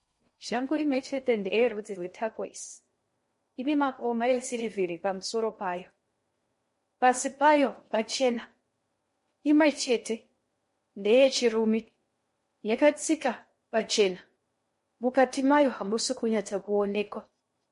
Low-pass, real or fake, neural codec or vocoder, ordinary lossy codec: 10.8 kHz; fake; codec, 16 kHz in and 24 kHz out, 0.6 kbps, FocalCodec, streaming, 2048 codes; MP3, 48 kbps